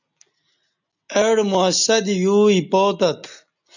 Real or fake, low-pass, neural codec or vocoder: real; 7.2 kHz; none